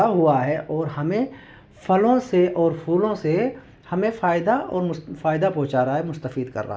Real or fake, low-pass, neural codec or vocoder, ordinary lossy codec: real; none; none; none